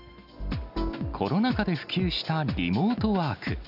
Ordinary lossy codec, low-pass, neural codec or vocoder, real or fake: AAC, 48 kbps; 5.4 kHz; none; real